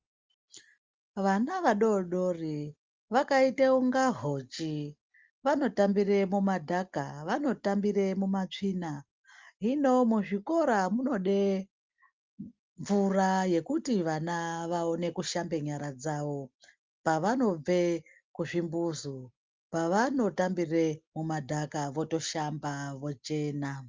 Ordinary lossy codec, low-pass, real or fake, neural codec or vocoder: Opus, 24 kbps; 7.2 kHz; real; none